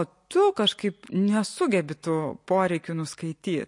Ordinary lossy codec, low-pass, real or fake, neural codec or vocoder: MP3, 48 kbps; 10.8 kHz; real; none